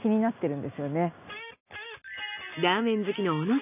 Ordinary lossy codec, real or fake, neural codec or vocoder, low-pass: none; real; none; 3.6 kHz